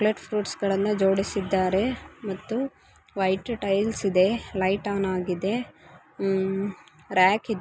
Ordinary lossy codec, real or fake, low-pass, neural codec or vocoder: none; real; none; none